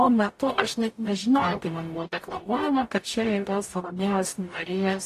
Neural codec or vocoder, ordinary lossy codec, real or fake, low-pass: codec, 44.1 kHz, 0.9 kbps, DAC; AAC, 48 kbps; fake; 14.4 kHz